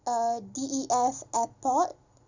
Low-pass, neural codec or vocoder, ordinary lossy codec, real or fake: 7.2 kHz; none; none; real